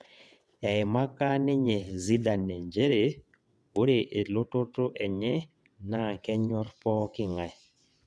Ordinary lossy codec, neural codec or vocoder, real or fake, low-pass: none; vocoder, 22.05 kHz, 80 mel bands, WaveNeXt; fake; none